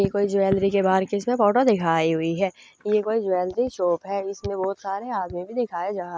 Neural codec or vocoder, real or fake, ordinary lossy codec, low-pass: none; real; none; none